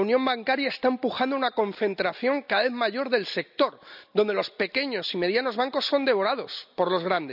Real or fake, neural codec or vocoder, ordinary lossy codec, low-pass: real; none; none; 5.4 kHz